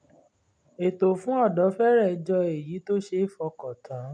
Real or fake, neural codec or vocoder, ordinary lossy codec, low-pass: real; none; none; 9.9 kHz